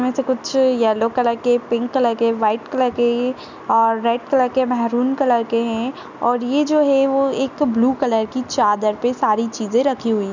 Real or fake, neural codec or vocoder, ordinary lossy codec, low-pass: real; none; none; 7.2 kHz